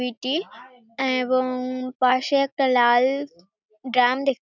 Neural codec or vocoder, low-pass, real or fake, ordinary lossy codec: none; 7.2 kHz; real; none